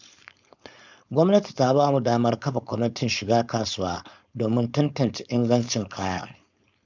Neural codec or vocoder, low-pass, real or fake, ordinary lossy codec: codec, 16 kHz, 4.8 kbps, FACodec; 7.2 kHz; fake; none